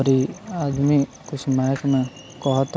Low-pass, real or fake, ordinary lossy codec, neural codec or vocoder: none; real; none; none